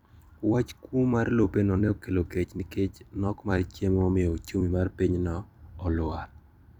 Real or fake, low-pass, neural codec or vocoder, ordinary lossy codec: fake; 19.8 kHz; vocoder, 44.1 kHz, 128 mel bands every 256 samples, BigVGAN v2; none